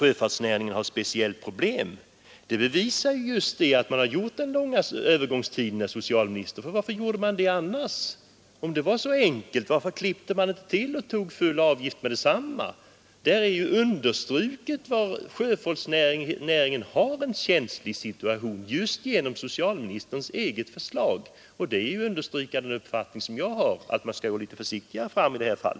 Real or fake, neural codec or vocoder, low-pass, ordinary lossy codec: real; none; none; none